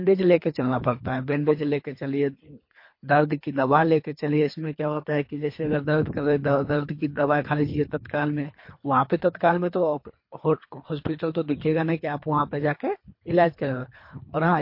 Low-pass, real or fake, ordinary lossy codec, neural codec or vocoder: 5.4 kHz; fake; MP3, 32 kbps; codec, 24 kHz, 3 kbps, HILCodec